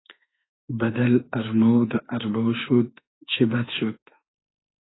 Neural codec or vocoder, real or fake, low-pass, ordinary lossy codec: autoencoder, 48 kHz, 32 numbers a frame, DAC-VAE, trained on Japanese speech; fake; 7.2 kHz; AAC, 16 kbps